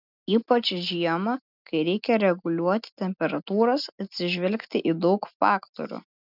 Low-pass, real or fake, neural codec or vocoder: 5.4 kHz; real; none